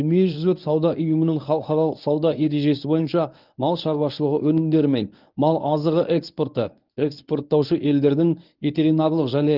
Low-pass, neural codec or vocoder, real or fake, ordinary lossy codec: 5.4 kHz; codec, 24 kHz, 0.9 kbps, WavTokenizer, medium speech release version 1; fake; Opus, 24 kbps